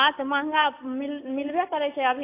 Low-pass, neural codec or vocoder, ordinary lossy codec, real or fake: 3.6 kHz; none; AAC, 24 kbps; real